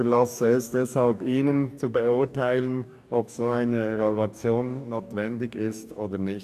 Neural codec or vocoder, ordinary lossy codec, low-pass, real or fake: codec, 44.1 kHz, 2.6 kbps, DAC; none; 14.4 kHz; fake